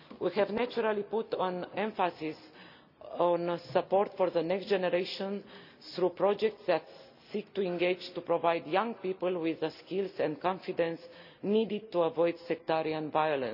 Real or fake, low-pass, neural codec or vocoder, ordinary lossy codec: real; 5.4 kHz; none; none